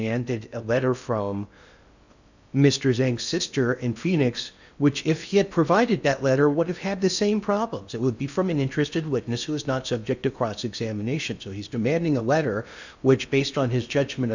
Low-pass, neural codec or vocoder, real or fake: 7.2 kHz; codec, 16 kHz in and 24 kHz out, 0.6 kbps, FocalCodec, streaming, 2048 codes; fake